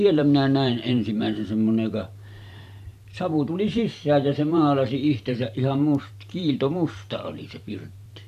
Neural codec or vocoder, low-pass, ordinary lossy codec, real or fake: vocoder, 44.1 kHz, 128 mel bands every 512 samples, BigVGAN v2; 14.4 kHz; AAC, 96 kbps; fake